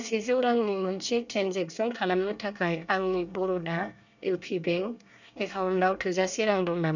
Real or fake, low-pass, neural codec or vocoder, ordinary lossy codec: fake; 7.2 kHz; codec, 24 kHz, 1 kbps, SNAC; none